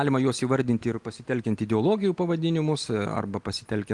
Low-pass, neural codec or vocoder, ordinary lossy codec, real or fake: 10.8 kHz; none; Opus, 24 kbps; real